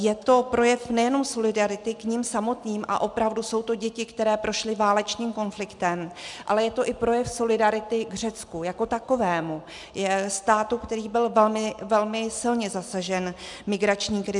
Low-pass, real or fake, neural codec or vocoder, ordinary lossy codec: 10.8 kHz; real; none; MP3, 96 kbps